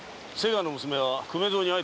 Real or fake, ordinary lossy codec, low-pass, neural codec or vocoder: real; none; none; none